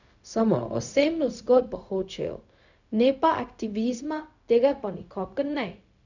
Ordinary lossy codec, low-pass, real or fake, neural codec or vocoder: none; 7.2 kHz; fake; codec, 16 kHz, 0.4 kbps, LongCat-Audio-Codec